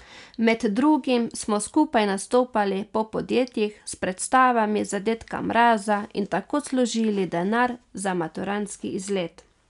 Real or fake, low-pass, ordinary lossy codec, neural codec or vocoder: real; 10.8 kHz; none; none